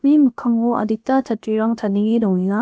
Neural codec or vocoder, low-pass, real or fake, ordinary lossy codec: codec, 16 kHz, about 1 kbps, DyCAST, with the encoder's durations; none; fake; none